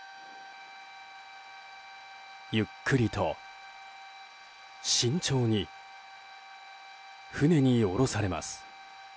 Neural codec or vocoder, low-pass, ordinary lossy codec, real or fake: none; none; none; real